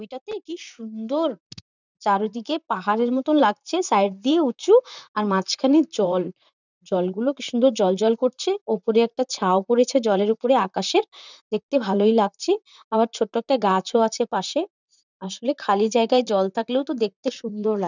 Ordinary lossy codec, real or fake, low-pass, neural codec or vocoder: none; fake; 7.2 kHz; vocoder, 44.1 kHz, 128 mel bands, Pupu-Vocoder